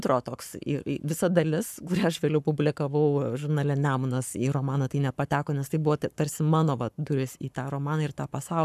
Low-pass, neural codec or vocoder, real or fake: 14.4 kHz; codec, 44.1 kHz, 7.8 kbps, Pupu-Codec; fake